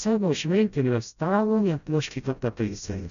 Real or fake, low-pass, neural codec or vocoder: fake; 7.2 kHz; codec, 16 kHz, 0.5 kbps, FreqCodec, smaller model